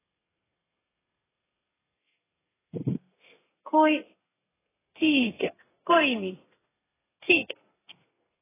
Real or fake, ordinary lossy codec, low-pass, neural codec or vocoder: fake; AAC, 16 kbps; 3.6 kHz; codec, 32 kHz, 1.9 kbps, SNAC